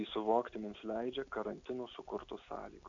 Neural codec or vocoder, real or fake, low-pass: none; real; 7.2 kHz